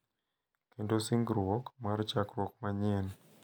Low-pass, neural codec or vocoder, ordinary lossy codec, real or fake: none; none; none; real